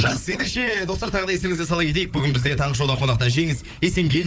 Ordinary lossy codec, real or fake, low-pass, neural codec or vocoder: none; fake; none; codec, 16 kHz, 4 kbps, FunCodec, trained on Chinese and English, 50 frames a second